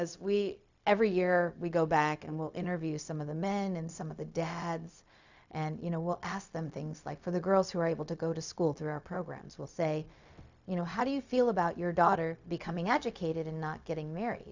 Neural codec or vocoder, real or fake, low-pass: codec, 16 kHz, 0.4 kbps, LongCat-Audio-Codec; fake; 7.2 kHz